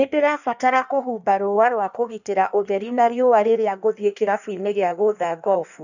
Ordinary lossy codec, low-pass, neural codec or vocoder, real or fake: none; 7.2 kHz; codec, 16 kHz in and 24 kHz out, 1.1 kbps, FireRedTTS-2 codec; fake